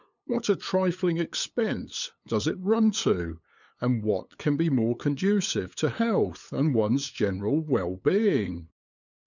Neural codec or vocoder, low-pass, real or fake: codec, 16 kHz, 8 kbps, FunCodec, trained on LibriTTS, 25 frames a second; 7.2 kHz; fake